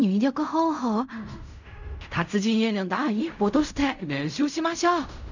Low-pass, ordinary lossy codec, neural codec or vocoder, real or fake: 7.2 kHz; none; codec, 16 kHz in and 24 kHz out, 0.4 kbps, LongCat-Audio-Codec, fine tuned four codebook decoder; fake